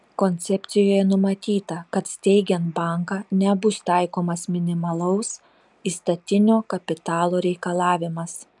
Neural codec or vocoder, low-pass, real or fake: none; 10.8 kHz; real